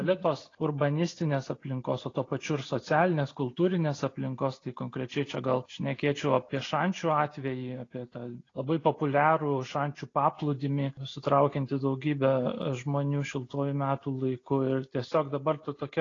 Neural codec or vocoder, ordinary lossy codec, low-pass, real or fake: none; AAC, 32 kbps; 7.2 kHz; real